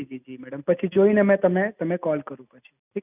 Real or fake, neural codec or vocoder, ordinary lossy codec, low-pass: real; none; none; 3.6 kHz